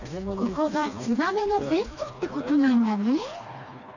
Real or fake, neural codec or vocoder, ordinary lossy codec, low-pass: fake; codec, 16 kHz, 2 kbps, FreqCodec, smaller model; none; 7.2 kHz